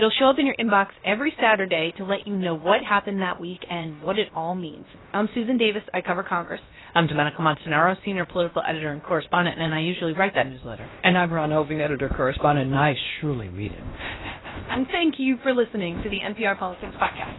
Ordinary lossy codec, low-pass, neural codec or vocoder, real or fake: AAC, 16 kbps; 7.2 kHz; codec, 16 kHz, about 1 kbps, DyCAST, with the encoder's durations; fake